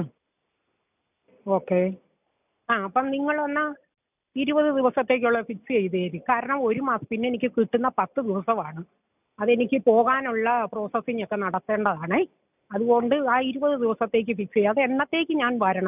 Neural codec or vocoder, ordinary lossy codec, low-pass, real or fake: none; none; 3.6 kHz; real